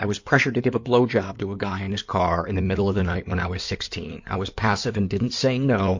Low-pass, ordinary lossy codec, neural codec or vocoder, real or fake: 7.2 kHz; MP3, 48 kbps; codec, 16 kHz in and 24 kHz out, 2.2 kbps, FireRedTTS-2 codec; fake